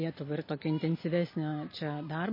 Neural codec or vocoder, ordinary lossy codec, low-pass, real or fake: none; MP3, 24 kbps; 5.4 kHz; real